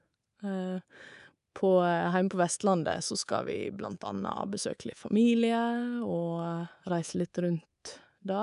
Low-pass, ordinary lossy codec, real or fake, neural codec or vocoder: 10.8 kHz; none; fake; codec, 24 kHz, 3.1 kbps, DualCodec